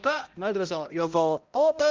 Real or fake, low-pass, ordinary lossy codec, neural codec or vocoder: fake; 7.2 kHz; Opus, 32 kbps; codec, 16 kHz, 0.5 kbps, X-Codec, HuBERT features, trained on balanced general audio